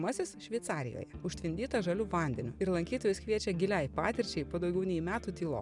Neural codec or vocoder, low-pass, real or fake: none; 10.8 kHz; real